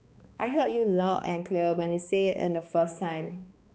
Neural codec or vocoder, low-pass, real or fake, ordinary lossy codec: codec, 16 kHz, 2 kbps, X-Codec, HuBERT features, trained on balanced general audio; none; fake; none